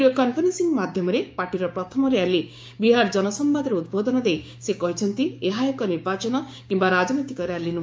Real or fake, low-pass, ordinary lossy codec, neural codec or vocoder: fake; none; none; codec, 16 kHz, 6 kbps, DAC